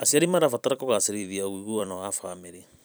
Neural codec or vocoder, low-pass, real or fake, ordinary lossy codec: vocoder, 44.1 kHz, 128 mel bands every 256 samples, BigVGAN v2; none; fake; none